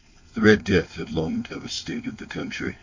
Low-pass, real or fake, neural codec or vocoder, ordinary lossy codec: 7.2 kHz; fake; codec, 16 kHz, 4.8 kbps, FACodec; MP3, 48 kbps